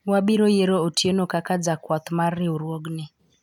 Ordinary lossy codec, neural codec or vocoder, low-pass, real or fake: none; none; 19.8 kHz; real